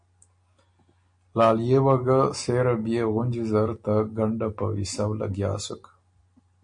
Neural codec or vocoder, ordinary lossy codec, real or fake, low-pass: none; MP3, 48 kbps; real; 9.9 kHz